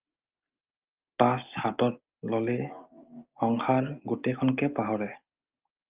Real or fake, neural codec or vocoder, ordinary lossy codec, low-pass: real; none; Opus, 24 kbps; 3.6 kHz